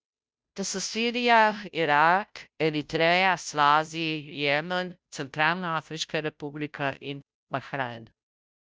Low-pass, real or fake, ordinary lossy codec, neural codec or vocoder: none; fake; none; codec, 16 kHz, 0.5 kbps, FunCodec, trained on Chinese and English, 25 frames a second